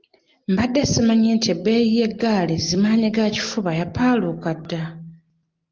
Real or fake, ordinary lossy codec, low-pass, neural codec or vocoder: real; Opus, 24 kbps; 7.2 kHz; none